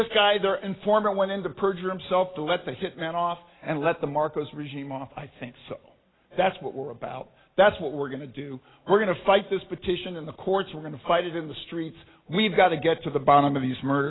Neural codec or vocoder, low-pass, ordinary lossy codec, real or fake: none; 7.2 kHz; AAC, 16 kbps; real